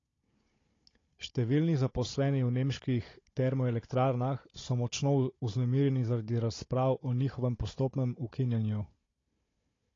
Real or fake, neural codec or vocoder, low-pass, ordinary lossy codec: fake; codec, 16 kHz, 16 kbps, FunCodec, trained on Chinese and English, 50 frames a second; 7.2 kHz; AAC, 32 kbps